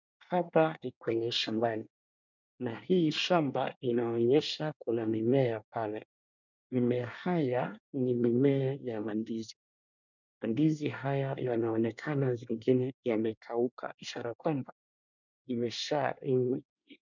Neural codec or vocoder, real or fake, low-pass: codec, 24 kHz, 1 kbps, SNAC; fake; 7.2 kHz